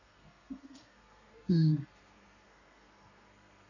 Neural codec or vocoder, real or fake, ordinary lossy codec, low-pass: codec, 32 kHz, 1.9 kbps, SNAC; fake; none; 7.2 kHz